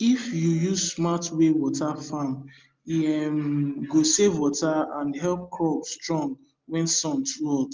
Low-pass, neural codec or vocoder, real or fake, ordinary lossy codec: 7.2 kHz; none; real; Opus, 32 kbps